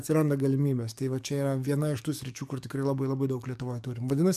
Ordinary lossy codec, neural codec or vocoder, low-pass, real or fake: MP3, 96 kbps; codec, 44.1 kHz, 7.8 kbps, DAC; 14.4 kHz; fake